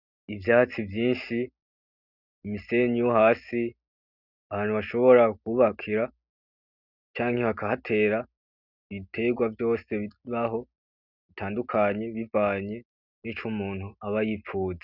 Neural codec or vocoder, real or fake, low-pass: none; real; 5.4 kHz